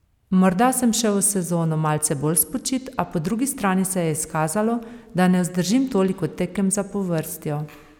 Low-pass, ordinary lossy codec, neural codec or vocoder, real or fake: 19.8 kHz; none; none; real